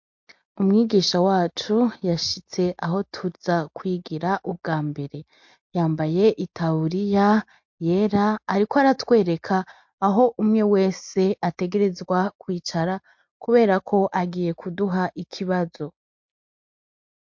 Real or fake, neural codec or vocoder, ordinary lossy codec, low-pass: real; none; MP3, 48 kbps; 7.2 kHz